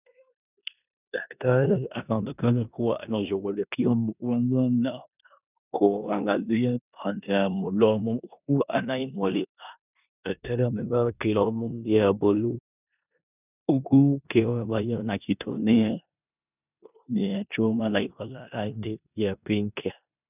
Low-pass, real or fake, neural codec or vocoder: 3.6 kHz; fake; codec, 16 kHz in and 24 kHz out, 0.9 kbps, LongCat-Audio-Codec, four codebook decoder